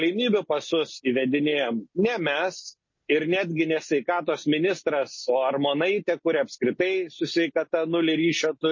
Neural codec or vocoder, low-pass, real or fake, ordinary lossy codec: none; 7.2 kHz; real; MP3, 32 kbps